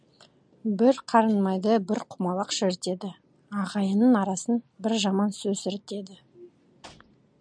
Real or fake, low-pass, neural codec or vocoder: real; 9.9 kHz; none